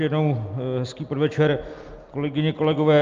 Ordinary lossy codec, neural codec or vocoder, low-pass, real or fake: Opus, 32 kbps; none; 7.2 kHz; real